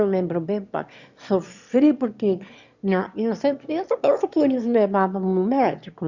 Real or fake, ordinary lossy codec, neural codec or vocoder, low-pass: fake; Opus, 64 kbps; autoencoder, 22.05 kHz, a latent of 192 numbers a frame, VITS, trained on one speaker; 7.2 kHz